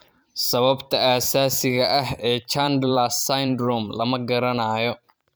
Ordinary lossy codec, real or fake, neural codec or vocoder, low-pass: none; fake; vocoder, 44.1 kHz, 128 mel bands every 512 samples, BigVGAN v2; none